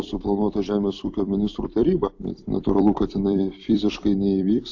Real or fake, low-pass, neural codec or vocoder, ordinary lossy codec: fake; 7.2 kHz; vocoder, 44.1 kHz, 128 mel bands every 256 samples, BigVGAN v2; AAC, 48 kbps